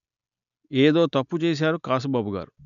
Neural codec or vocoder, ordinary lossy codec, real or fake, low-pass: none; AAC, 96 kbps; real; 7.2 kHz